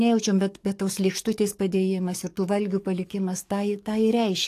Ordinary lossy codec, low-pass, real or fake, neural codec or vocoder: AAC, 64 kbps; 14.4 kHz; fake; codec, 44.1 kHz, 7.8 kbps, DAC